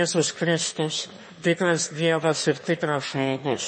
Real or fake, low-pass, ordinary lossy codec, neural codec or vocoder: fake; 9.9 kHz; MP3, 32 kbps; autoencoder, 22.05 kHz, a latent of 192 numbers a frame, VITS, trained on one speaker